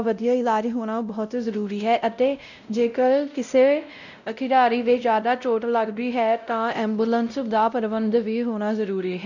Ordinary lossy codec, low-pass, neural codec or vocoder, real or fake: none; 7.2 kHz; codec, 16 kHz, 0.5 kbps, X-Codec, WavLM features, trained on Multilingual LibriSpeech; fake